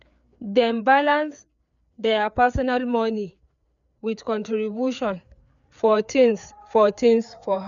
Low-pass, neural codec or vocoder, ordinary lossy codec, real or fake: 7.2 kHz; codec, 16 kHz, 4 kbps, FreqCodec, larger model; none; fake